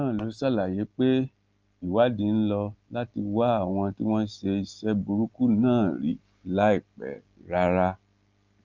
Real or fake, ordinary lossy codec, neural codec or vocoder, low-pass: real; Opus, 24 kbps; none; 7.2 kHz